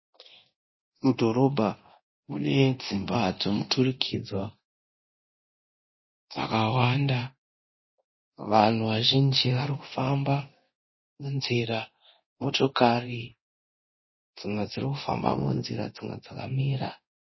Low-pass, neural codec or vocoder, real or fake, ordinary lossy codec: 7.2 kHz; codec, 24 kHz, 0.9 kbps, DualCodec; fake; MP3, 24 kbps